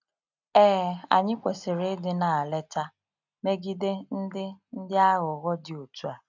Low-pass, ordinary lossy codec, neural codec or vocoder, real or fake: 7.2 kHz; none; none; real